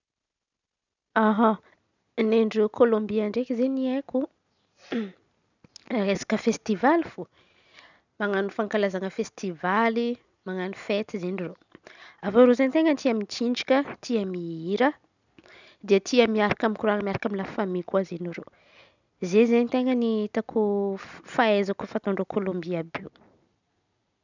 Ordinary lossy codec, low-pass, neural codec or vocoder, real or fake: none; 7.2 kHz; none; real